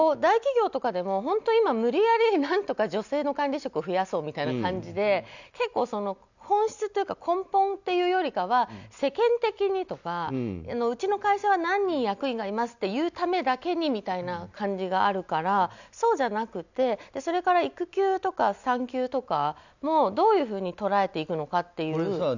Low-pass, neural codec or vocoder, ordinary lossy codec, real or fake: 7.2 kHz; none; none; real